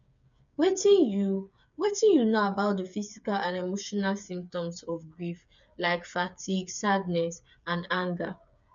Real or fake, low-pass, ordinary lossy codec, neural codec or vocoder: fake; 7.2 kHz; none; codec, 16 kHz, 8 kbps, FreqCodec, smaller model